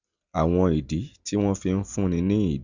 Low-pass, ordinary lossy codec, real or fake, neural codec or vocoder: 7.2 kHz; Opus, 64 kbps; real; none